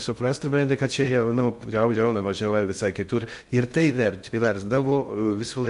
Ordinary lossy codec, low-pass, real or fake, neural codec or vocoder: MP3, 64 kbps; 10.8 kHz; fake; codec, 16 kHz in and 24 kHz out, 0.6 kbps, FocalCodec, streaming, 2048 codes